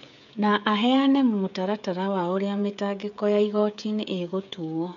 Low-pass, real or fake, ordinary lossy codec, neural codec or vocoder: 7.2 kHz; fake; none; codec, 16 kHz, 16 kbps, FreqCodec, smaller model